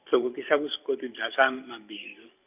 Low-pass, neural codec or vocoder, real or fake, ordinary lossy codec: 3.6 kHz; vocoder, 44.1 kHz, 128 mel bands every 512 samples, BigVGAN v2; fake; none